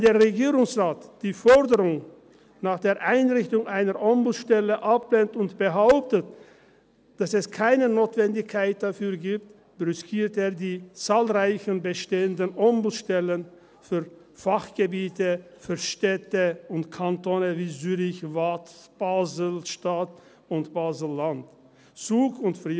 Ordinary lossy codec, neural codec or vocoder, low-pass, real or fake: none; none; none; real